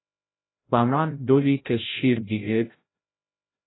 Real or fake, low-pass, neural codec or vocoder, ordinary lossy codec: fake; 7.2 kHz; codec, 16 kHz, 0.5 kbps, FreqCodec, larger model; AAC, 16 kbps